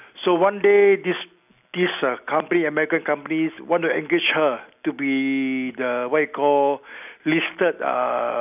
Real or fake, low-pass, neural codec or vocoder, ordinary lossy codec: real; 3.6 kHz; none; none